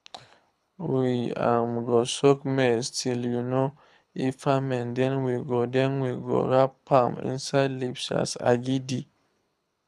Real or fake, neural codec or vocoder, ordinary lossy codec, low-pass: fake; codec, 24 kHz, 6 kbps, HILCodec; none; none